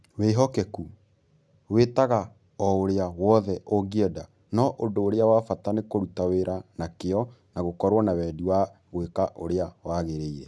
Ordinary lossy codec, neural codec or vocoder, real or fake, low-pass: none; none; real; none